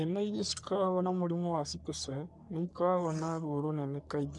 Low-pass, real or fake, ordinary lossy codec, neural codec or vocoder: 10.8 kHz; fake; none; codec, 44.1 kHz, 3.4 kbps, Pupu-Codec